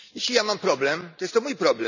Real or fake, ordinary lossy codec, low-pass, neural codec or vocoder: real; none; 7.2 kHz; none